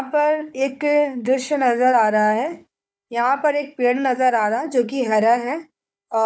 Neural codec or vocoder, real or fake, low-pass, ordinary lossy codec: codec, 16 kHz, 4 kbps, FunCodec, trained on Chinese and English, 50 frames a second; fake; none; none